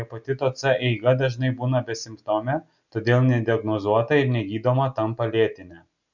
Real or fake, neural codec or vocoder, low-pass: real; none; 7.2 kHz